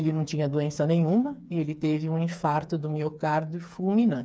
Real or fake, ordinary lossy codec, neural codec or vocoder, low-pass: fake; none; codec, 16 kHz, 4 kbps, FreqCodec, smaller model; none